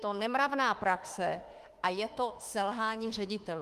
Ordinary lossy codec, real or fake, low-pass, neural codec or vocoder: Opus, 24 kbps; fake; 14.4 kHz; autoencoder, 48 kHz, 32 numbers a frame, DAC-VAE, trained on Japanese speech